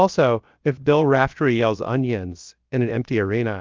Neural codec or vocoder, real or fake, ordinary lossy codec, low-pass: codec, 16 kHz, about 1 kbps, DyCAST, with the encoder's durations; fake; Opus, 24 kbps; 7.2 kHz